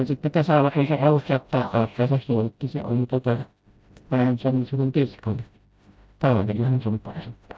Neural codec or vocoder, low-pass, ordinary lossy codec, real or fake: codec, 16 kHz, 0.5 kbps, FreqCodec, smaller model; none; none; fake